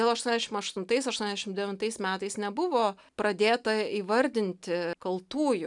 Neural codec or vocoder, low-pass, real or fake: none; 10.8 kHz; real